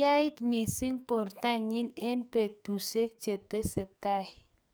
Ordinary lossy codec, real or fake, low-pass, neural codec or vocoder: none; fake; none; codec, 44.1 kHz, 2.6 kbps, SNAC